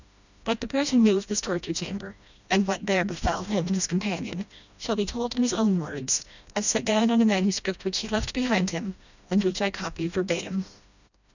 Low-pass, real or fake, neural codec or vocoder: 7.2 kHz; fake; codec, 16 kHz, 1 kbps, FreqCodec, smaller model